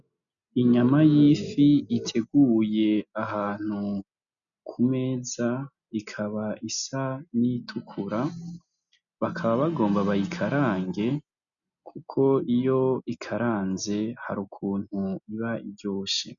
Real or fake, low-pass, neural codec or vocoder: real; 7.2 kHz; none